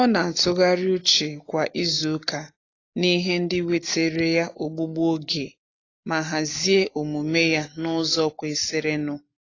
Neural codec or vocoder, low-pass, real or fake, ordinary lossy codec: none; 7.2 kHz; real; AAC, 32 kbps